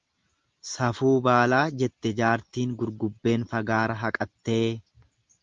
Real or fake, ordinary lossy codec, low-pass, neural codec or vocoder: real; Opus, 32 kbps; 7.2 kHz; none